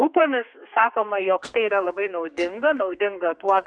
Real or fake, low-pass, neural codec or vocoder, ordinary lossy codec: fake; 9.9 kHz; codec, 32 kHz, 1.9 kbps, SNAC; MP3, 96 kbps